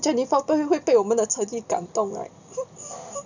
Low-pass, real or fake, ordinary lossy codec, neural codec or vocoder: 7.2 kHz; real; none; none